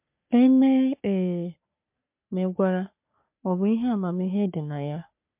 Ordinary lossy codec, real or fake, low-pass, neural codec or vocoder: MP3, 32 kbps; fake; 3.6 kHz; codec, 44.1 kHz, 3.4 kbps, Pupu-Codec